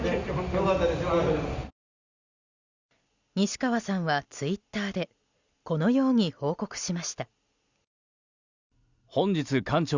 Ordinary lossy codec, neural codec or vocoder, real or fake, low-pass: Opus, 64 kbps; none; real; 7.2 kHz